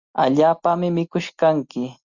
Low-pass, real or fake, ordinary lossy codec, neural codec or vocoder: 7.2 kHz; real; Opus, 64 kbps; none